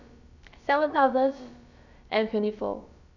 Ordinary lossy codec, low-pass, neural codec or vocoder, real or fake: none; 7.2 kHz; codec, 16 kHz, about 1 kbps, DyCAST, with the encoder's durations; fake